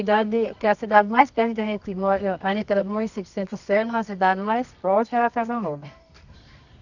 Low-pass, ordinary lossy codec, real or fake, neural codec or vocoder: 7.2 kHz; none; fake; codec, 24 kHz, 0.9 kbps, WavTokenizer, medium music audio release